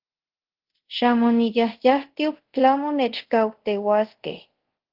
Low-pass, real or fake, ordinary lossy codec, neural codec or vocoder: 5.4 kHz; fake; Opus, 16 kbps; codec, 24 kHz, 0.5 kbps, DualCodec